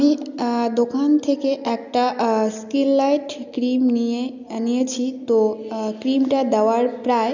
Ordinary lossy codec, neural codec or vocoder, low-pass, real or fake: none; none; 7.2 kHz; real